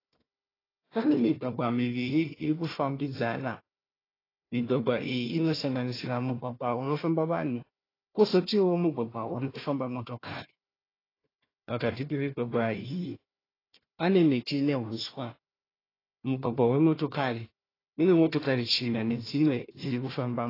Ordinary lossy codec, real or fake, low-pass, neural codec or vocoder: AAC, 24 kbps; fake; 5.4 kHz; codec, 16 kHz, 1 kbps, FunCodec, trained on Chinese and English, 50 frames a second